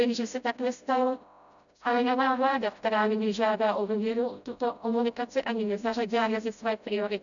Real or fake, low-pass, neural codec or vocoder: fake; 7.2 kHz; codec, 16 kHz, 0.5 kbps, FreqCodec, smaller model